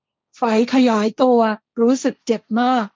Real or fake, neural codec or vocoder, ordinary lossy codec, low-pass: fake; codec, 16 kHz, 1.1 kbps, Voila-Tokenizer; none; none